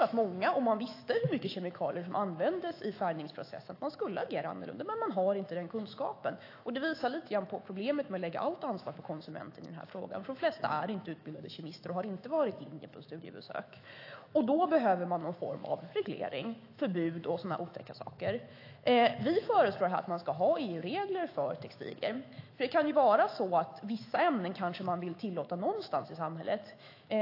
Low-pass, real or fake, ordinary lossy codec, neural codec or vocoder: 5.4 kHz; fake; AAC, 32 kbps; autoencoder, 48 kHz, 128 numbers a frame, DAC-VAE, trained on Japanese speech